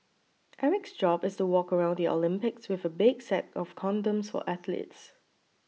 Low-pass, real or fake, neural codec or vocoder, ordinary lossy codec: none; real; none; none